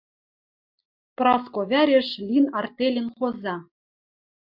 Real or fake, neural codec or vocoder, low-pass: real; none; 5.4 kHz